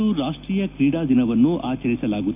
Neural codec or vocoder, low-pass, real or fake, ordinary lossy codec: none; 3.6 kHz; real; none